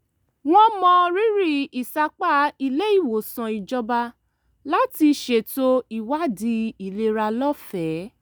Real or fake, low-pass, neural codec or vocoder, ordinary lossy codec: real; none; none; none